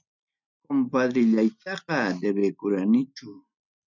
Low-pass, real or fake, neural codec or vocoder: 7.2 kHz; real; none